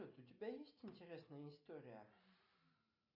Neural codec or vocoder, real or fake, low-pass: none; real; 5.4 kHz